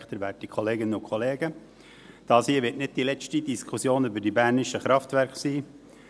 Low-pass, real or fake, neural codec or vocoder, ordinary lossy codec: none; real; none; none